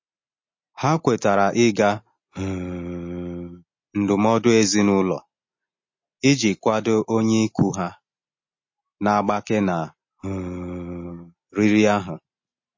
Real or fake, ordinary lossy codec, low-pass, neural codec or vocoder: real; MP3, 32 kbps; 7.2 kHz; none